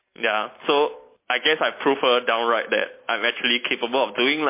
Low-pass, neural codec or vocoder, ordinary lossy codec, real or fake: 3.6 kHz; none; MP3, 24 kbps; real